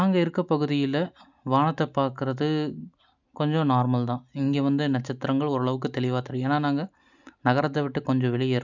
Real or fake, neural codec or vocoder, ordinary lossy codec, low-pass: real; none; none; 7.2 kHz